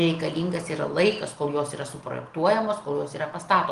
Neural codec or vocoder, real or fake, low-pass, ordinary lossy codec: none; real; 10.8 kHz; Opus, 24 kbps